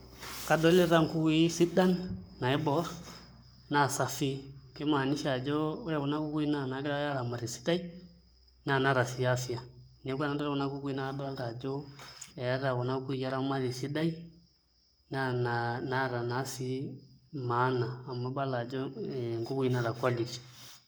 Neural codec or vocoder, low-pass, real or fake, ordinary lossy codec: codec, 44.1 kHz, 7.8 kbps, Pupu-Codec; none; fake; none